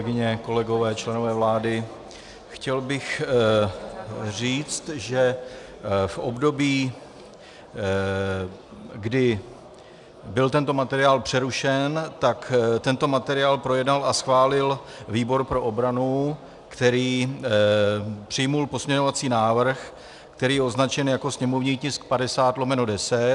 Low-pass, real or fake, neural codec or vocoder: 10.8 kHz; real; none